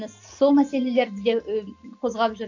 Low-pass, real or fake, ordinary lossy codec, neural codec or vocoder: 7.2 kHz; real; AAC, 48 kbps; none